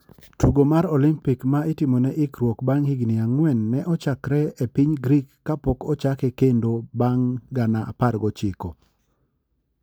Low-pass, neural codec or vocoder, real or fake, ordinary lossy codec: none; none; real; none